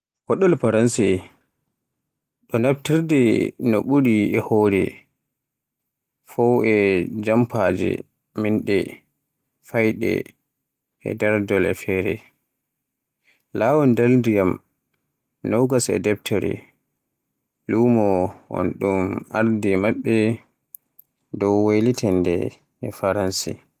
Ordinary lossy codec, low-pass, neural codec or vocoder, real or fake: Opus, 24 kbps; 14.4 kHz; none; real